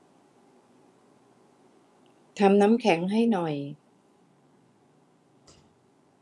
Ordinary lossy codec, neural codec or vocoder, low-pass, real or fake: none; vocoder, 24 kHz, 100 mel bands, Vocos; none; fake